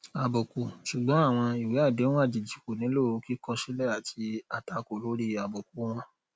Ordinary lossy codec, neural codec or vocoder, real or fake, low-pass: none; none; real; none